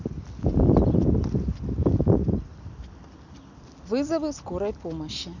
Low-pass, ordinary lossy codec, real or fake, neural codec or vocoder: 7.2 kHz; none; real; none